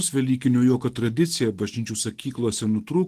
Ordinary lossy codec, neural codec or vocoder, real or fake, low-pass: Opus, 16 kbps; none; real; 14.4 kHz